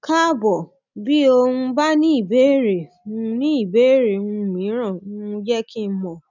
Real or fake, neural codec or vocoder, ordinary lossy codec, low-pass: real; none; none; 7.2 kHz